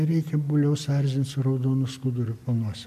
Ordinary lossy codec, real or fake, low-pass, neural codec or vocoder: MP3, 64 kbps; real; 14.4 kHz; none